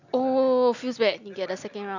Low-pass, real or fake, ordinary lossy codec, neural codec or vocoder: 7.2 kHz; real; none; none